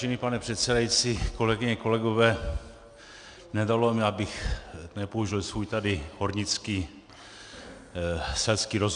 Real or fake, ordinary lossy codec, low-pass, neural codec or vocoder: real; MP3, 96 kbps; 9.9 kHz; none